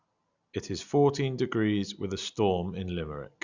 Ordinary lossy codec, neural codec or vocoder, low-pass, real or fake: Opus, 64 kbps; none; 7.2 kHz; real